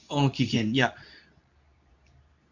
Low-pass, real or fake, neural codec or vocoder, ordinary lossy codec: 7.2 kHz; fake; codec, 24 kHz, 0.9 kbps, WavTokenizer, medium speech release version 2; none